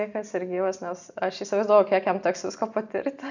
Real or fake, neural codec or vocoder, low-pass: real; none; 7.2 kHz